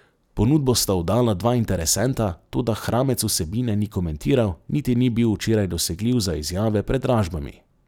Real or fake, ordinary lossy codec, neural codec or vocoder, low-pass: fake; none; vocoder, 44.1 kHz, 128 mel bands every 256 samples, BigVGAN v2; 19.8 kHz